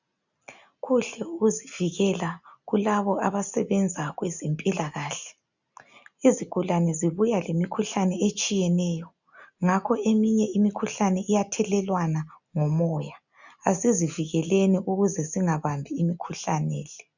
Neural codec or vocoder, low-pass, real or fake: none; 7.2 kHz; real